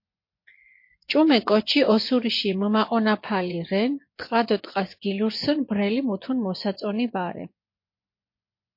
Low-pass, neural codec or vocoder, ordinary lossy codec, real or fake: 5.4 kHz; vocoder, 24 kHz, 100 mel bands, Vocos; MP3, 32 kbps; fake